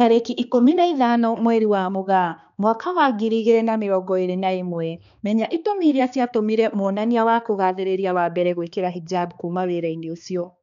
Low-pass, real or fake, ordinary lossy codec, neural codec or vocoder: 7.2 kHz; fake; none; codec, 16 kHz, 2 kbps, X-Codec, HuBERT features, trained on balanced general audio